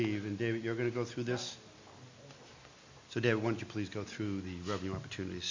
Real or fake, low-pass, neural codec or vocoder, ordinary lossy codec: real; 7.2 kHz; none; MP3, 48 kbps